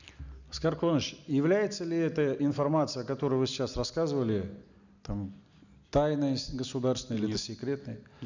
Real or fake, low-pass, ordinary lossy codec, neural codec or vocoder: real; 7.2 kHz; none; none